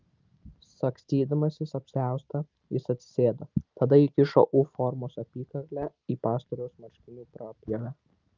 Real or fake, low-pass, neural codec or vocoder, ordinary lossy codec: real; 7.2 kHz; none; Opus, 24 kbps